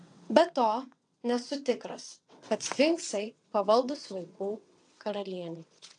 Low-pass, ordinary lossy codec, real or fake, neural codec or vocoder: 9.9 kHz; AAC, 64 kbps; fake; vocoder, 22.05 kHz, 80 mel bands, WaveNeXt